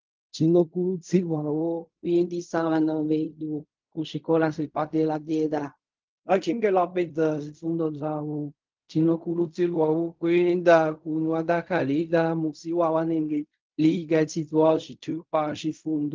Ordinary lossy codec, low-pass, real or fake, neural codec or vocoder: Opus, 32 kbps; 7.2 kHz; fake; codec, 16 kHz in and 24 kHz out, 0.4 kbps, LongCat-Audio-Codec, fine tuned four codebook decoder